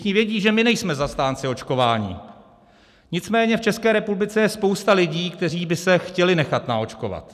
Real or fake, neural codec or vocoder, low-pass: real; none; 14.4 kHz